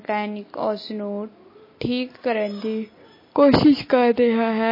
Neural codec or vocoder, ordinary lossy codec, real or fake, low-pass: none; MP3, 24 kbps; real; 5.4 kHz